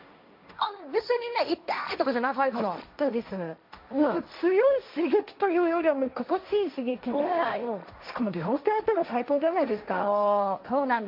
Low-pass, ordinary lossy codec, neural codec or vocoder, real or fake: 5.4 kHz; MP3, 48 kbps; codec, 16 kHz, 1.1 kbps, Voila-Tokenizer; fake